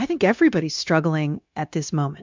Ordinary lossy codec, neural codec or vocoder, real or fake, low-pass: MP3, 64 kbps; codec, 16 kHz, 0.9 kbps, LongCat-Audio-Codec; fake; 7.2 kHz